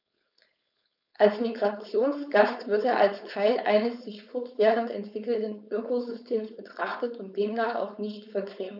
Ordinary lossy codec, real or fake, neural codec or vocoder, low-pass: none; fake; codec, 16 kHz, 4.8 kbps, FACodec; 5.4 kHz